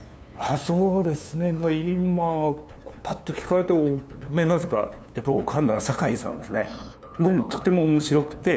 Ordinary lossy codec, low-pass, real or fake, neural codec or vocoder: none; none; fake; codec, 16 kHz, 2 kbps, FunCodec, trained on LibriTTS, 25 frames a second